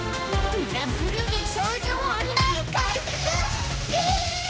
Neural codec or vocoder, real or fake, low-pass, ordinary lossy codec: codec, 16 kHz, 1 kbps, X-Codec, HuBERT features, trained on general audio; fake; none; none